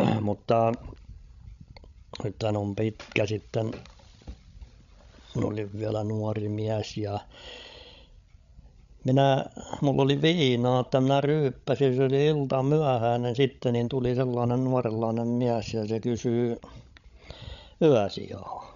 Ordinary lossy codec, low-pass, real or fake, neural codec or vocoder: none; 7.2 kHz; fake; codec, 16 kHz, 16 kbps, FreqCodec, larger model